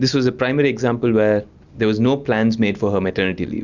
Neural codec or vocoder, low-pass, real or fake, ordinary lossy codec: none; 7.2 kHz; real; Opus, 64 kbps